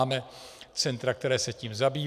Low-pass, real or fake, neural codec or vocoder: 14.4 kHz; real; none